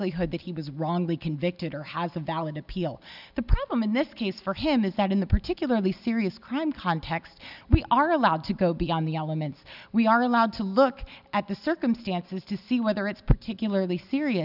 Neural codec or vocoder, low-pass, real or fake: none; 5.4 kHz; real